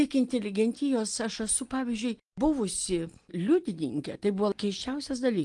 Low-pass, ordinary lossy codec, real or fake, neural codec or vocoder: 10.8 kHz; Opus, 24 kbps; real; none